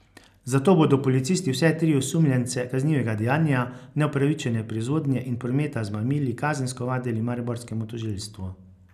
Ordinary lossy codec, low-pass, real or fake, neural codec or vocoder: none; 14.4 kHz; real; none